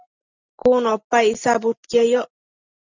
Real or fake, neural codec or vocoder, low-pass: real; none; 7.2 kHz